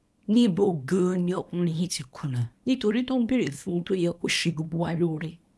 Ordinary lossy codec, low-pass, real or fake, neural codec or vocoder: none; none; fake; codec, 24 kHz, 0.9 kbps, WavTokenizer, small release